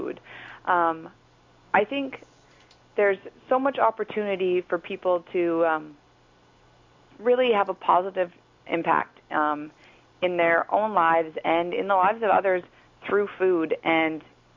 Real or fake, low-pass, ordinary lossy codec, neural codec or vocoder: real; 7.2 kHz; MP3, 64 kbps; none